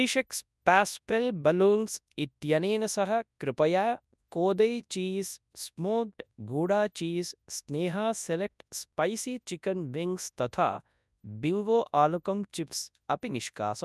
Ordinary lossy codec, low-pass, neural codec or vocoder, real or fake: none; none; codec, 24 kHz, 0.9 kbps, WavTokenizer, large speech release; fake